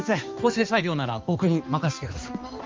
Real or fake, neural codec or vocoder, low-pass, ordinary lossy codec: fake; codec, 16 kHz, 2 kbps, X-Codec, HuBERT features, trained on balanced general audio; 7.2 kHz; Opus, 32 kbps